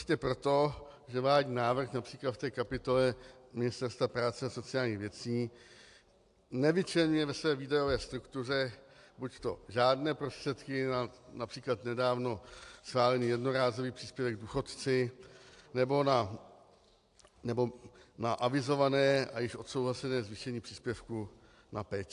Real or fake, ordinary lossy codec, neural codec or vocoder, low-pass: real; AAC, 64 kbps; none; 10.8 kHz